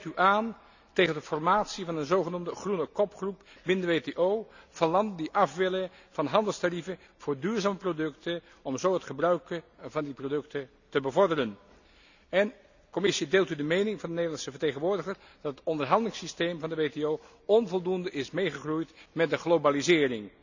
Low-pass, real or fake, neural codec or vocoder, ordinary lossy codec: 7.2 kHz; real; none; none